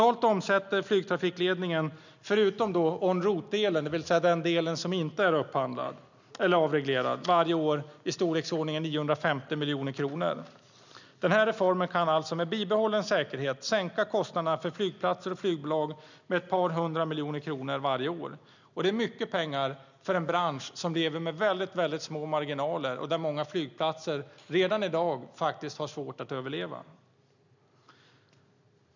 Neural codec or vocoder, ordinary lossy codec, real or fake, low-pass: none; none; real; 7.2 kHz